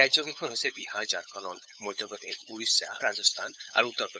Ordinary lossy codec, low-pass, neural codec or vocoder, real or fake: none; none; codec, 16 kHz, 8 kbps, FunCodec, trained on LibriTTS, 25 frames a second; fake